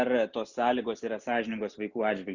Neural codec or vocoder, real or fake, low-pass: none; real; 7.2 kHz